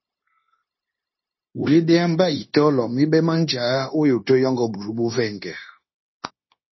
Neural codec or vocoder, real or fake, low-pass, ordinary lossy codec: codec, 16 kHz, 0.9 kbps, LongCat-Audio-Codec; fake; 7.2 kHz; MP3, 24 kbps